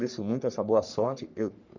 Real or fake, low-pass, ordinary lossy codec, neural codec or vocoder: fake; 7.2 kHz; none; codec, 44.1 kHz, 3.4 kbps, Pupu-Codec